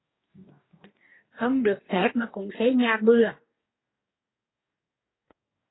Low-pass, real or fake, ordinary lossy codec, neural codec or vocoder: 7.2 kHz; fake; AAC, 16 kbps; codec, 44.1 kHz, 2.6 kbps, DAC